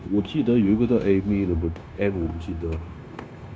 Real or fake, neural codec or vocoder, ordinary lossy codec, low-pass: fake; codec, 16 kHz, 0.9 kbps, LongCat-Audio-Codec; none; none